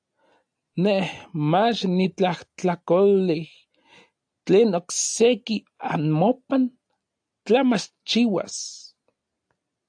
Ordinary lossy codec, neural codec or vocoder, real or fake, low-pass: AAC, 64 kbps; none; real; 9.9 kHz